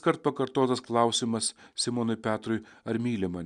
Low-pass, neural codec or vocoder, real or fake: 10.8 kHz; none; real